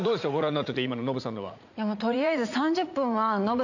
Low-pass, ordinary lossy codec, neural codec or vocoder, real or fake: 7.2 kHz; none; vocoder, 44.1 kHz, 80 mel bands, Vocos; fake